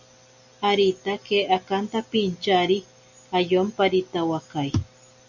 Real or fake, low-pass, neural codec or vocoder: real; 7.2 kHz; none